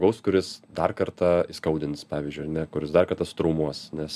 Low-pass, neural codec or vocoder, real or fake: 14.4 kHz; none; real